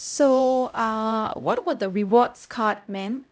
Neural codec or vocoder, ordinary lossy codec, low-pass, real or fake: codec, 16 kHz, 0.5 kbps, X-Codec, HuBERT features, trained on LibriSpeech; none; none; fake